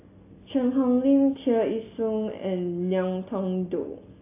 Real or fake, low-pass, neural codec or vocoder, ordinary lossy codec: real; 3.6 kHz; none; AAC, 24 kbps